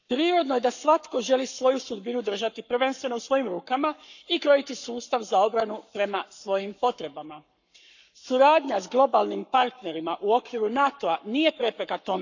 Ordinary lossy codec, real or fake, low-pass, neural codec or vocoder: none; fake; 7.2 kHz; codec, 44.1 kHz, 7.8 kbps, Pupu-Codec